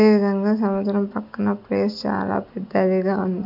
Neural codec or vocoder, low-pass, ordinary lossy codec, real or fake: none; 5.4 kHz; MP3, 48 kbps; real